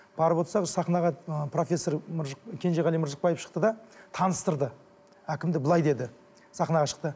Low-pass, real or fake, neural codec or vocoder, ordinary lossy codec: none; real; none; none